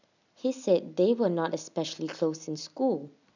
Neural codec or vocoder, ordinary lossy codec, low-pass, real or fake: none; none; 7.2 kHz; real